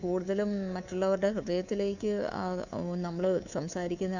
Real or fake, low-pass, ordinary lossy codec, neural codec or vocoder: fake; 7.2 kHz; none; codec, 24 kHz, 3.1 kbps, DualCodec